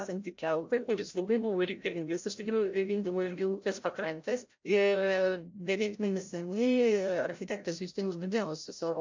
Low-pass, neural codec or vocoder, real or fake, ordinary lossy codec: 7.2 kHz; codec, 16 kHz, 0.5 kbps, FreqCodec, larger model; fake; MP3, 48 kbps